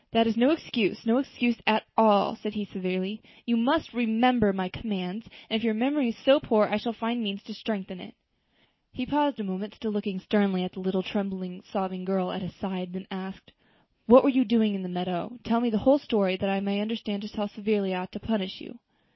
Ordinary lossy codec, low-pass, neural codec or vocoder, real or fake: MP3, 24 kbps; 7.2 kHz; none; real